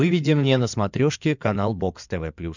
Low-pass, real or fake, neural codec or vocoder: 7.2 kHz; fake; codec, 16 kHz in and 24 kHz out, 2.2 kbps, FireRedTTS-2 codec